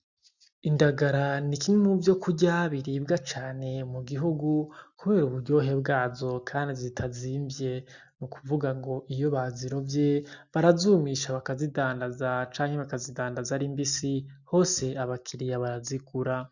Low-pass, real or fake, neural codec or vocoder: 7.2 kHz; real; none